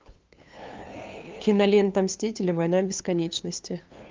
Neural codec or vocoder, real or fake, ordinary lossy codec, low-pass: codec, 16 kHz, 2 kbps, FunCodec, trained on LibriTTS, 25 frames a second; fake; Opus, 24 kbps; 7.2 kHz